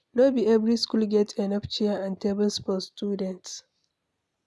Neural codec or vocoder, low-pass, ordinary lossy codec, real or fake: none; none; none; real